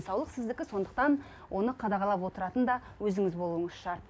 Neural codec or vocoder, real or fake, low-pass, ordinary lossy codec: none; real; none; none